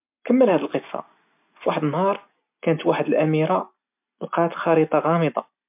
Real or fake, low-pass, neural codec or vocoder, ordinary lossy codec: real; 3.6 kHz; none; MP3, 32 kbps